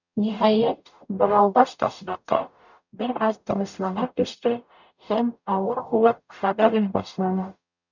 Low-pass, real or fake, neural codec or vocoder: 7.2 kHz; fake; codec, 44.1 kHz, 0.9 kbps, DAC